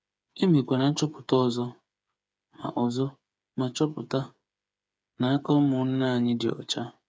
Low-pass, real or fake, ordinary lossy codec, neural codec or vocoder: none; fake; none; codec, 16 kHz, 8 kbps, FreqCodec, smaller model